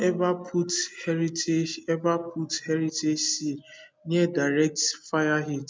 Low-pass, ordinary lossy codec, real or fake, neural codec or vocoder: none; none; real; none